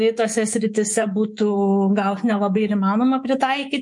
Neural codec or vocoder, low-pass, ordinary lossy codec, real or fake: codec, 24 kHz, 3.1 kbps, DualCodec; 10.8 kHz; MP3, 48 kbps; fake